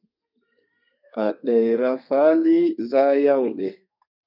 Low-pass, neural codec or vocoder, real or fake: 5.4 kHz; codec, 32 kHz, 1.9 kbps, SNAC; fake